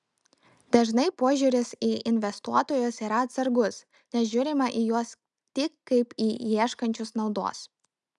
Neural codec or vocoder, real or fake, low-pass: none; real; 10.8 kHz